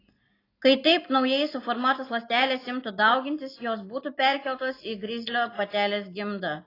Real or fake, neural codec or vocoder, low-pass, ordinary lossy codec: real; none; 5.4 kHz; AAC, 24 kbps